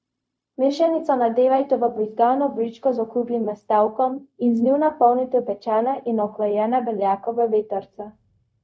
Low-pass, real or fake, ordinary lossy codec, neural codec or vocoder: none; fake; none; codec, 16 kHz, 0.4 kbps, LongCat-Audio-Codec